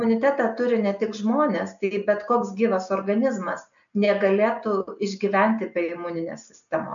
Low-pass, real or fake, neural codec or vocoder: 7.2 kHz; real; none